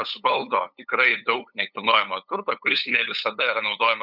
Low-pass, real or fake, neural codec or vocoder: 5.4 kHz; fake; codec, 16 kHz, 8 kbps, FunCodec, trained on LibriTTS, 25 frames a second